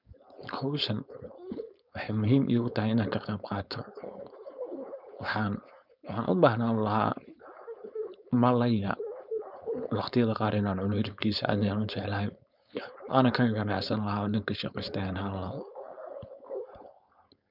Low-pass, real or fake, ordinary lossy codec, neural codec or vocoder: 5.4 kHz; fake; none; codec, 16 kHz, 4.8 kbps, FACodec